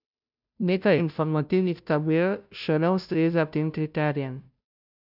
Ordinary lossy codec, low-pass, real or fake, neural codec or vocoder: none; 5.4 kHz; fake; codec, 16 kHz, 0.5 kbps, FunCodec, trained on Chinese and English, 25 frames a second